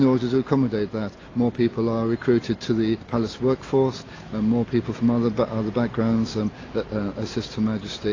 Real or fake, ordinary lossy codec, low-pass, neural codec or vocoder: real; AAC, 32 kbps; 7.2 kHz; none